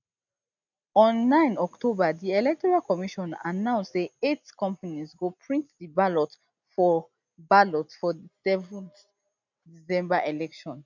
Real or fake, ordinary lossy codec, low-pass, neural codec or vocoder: real; none; 7.2 kHz; none